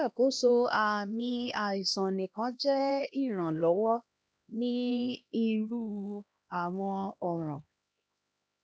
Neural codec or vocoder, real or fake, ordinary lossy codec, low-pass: codec, 16 kHz, 1 kbps, X-Codec, HuBERT features, trained on LibriSpeech; fake; none; none